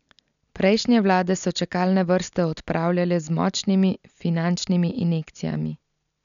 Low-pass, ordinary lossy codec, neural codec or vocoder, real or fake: 7.2 kHz; none; none; real